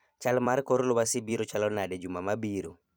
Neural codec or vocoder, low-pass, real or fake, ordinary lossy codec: vocoder, 44.1 kHz, 128 mel bands every 256 samples, BigVGAN v2; none; fake; none